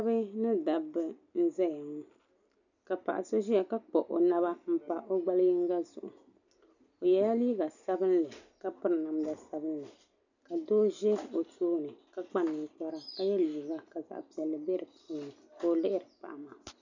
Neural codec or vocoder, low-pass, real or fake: none; 7.2 kHz; real